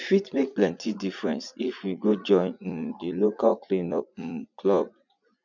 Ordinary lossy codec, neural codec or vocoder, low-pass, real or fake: none; vocoder, 44.1 kHz, 80 mel bands, Vocos; 7.2 kHz; fake